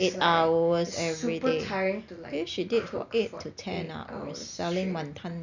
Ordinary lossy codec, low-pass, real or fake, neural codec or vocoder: none; 7.2 kHz; real; none